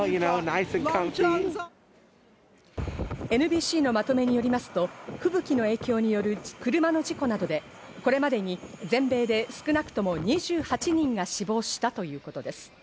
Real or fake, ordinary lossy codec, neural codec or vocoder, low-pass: real; none; none; none